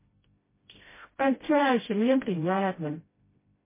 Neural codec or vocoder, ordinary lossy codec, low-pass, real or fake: codec, 16 kHz, 0.5 kbps, FreqCodec, smaller model; MP3, 16 kbps; 3.6 kHz; fake